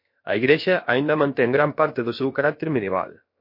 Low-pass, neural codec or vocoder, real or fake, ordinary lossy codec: 5.4 kHz; codec, 16 kHz, about 1 kbps, DyCAST, with the encoder's durations; fake; MP3, 32 kbps